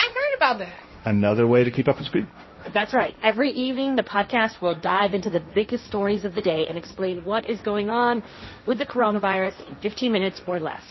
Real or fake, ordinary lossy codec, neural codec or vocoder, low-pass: fake; MP3, 24 kbps; codec, 16 kHz, 1.1 kbps, Voila-Tokenizer; 7.2 kHz